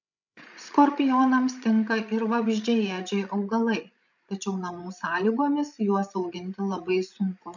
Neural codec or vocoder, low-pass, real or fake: codec, 16 kHz, 16 kbps, FreqCodec, larger model; 7.2 kHz; fake